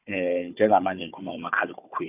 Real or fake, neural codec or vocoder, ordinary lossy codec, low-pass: fake; codec, 16 kHz, 4 kbps, FunCodec, trained on Chinese and English, 50 frames a second; none; 3.6 kHz